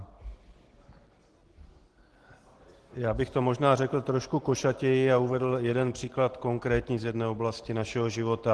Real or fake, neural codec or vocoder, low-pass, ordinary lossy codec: real; none; 10.8 kHz; Opus, 16 kbps